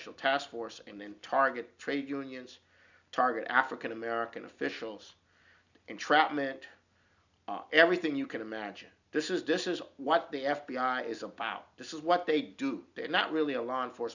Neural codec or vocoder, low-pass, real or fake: none; 7.2 kHz; real